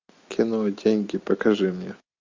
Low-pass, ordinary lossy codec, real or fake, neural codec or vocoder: 7.2 kHz; MP3, 64 kbps; real; none